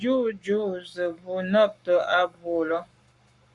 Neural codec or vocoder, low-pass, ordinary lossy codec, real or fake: codec, 44.1 kHz, 7.8 kbps, Pupu-Codec; 10.8 kHz; Opus, 64 kbps; fake